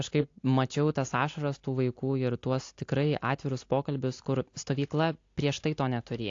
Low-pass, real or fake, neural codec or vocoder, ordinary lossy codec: 7.2 kHz; real; none; AAC, 48 kbps